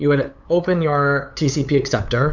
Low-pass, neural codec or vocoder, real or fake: 7.2 kHz; codec, 16 kHz, 8 kbps, FunCodec, trained on LibriTTS, 25 frames a second; fake